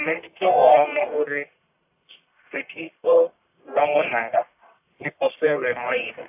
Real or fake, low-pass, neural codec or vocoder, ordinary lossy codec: fake; 3.6 kHz; codec, 44.1 kHz, 1.7 kbps, Pupu-Codec; AAC, 32 kbps